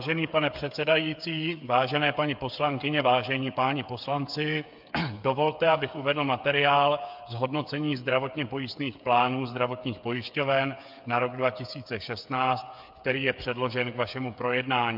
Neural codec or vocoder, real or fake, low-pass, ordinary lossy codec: codec, 16 kHz, 8 kbps, FreqCodec, smaller model; fake; 5.4 kHz; MP3, 48 kbps